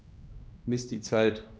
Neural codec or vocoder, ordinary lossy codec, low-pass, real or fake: codec, 16 kHz, 1 kbps, X-Codec, HuBERT features, trained on general audio; none; none; fake